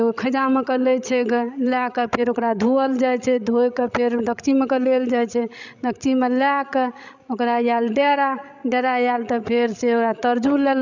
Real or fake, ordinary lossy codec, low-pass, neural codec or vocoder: fake; none; 7.2 kHz; codec, 16 kHz, 16 kbps, FreqCodec, larger model